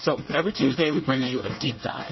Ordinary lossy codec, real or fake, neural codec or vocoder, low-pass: MP3, 24 kbps; fake; codec, 24 kHz, 1 kbps, SNAC; 7.2 kHz